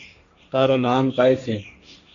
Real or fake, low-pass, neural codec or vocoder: fake; 7.2 kHz; codec, 16 kHz, 1.1 kbps, Voila-Tokenizer